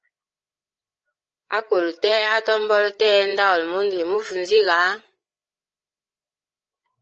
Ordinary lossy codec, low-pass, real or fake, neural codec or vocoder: Opus, 24 kbps; 7.2 kHz; fake; codec, 16 kHz, 8 kbps, FreqCodec, larger model